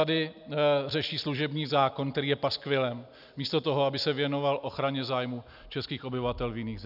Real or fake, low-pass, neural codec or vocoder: real; 5.4 kHz; none